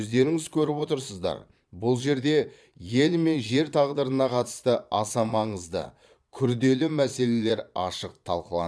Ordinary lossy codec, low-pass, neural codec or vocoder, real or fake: none; none; vocoder, 22.05 kHz, 80 mel bands, Vocos; fake